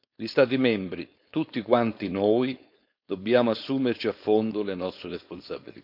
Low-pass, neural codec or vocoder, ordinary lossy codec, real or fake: 5.4 kHz; codec, 16 kHz, 4.8 kbps, FACodec; none; fake